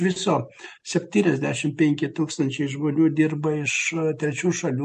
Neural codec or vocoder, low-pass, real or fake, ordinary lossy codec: none; 14.4 kHz; real; MP3, 48 kbps